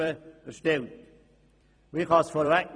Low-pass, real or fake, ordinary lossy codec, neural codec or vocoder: 9.9 kHz; fake; none; vocoder, 44.1 kHz, 128 mel bands every 256 samples, BigVGAN v2